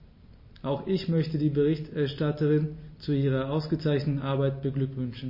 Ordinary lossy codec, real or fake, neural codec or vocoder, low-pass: MP3, 24 kbps; real; none; 5.4 kHz